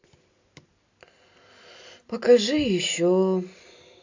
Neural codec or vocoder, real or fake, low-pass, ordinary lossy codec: none; real; 7.2 kHz; AAC, 48 kbps